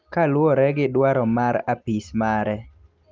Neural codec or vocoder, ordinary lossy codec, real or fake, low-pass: none; Opus, 32 kbps; real; 7.2 kHz